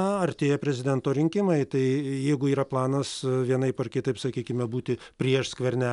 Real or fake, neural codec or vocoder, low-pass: real; none; 10.8 kHz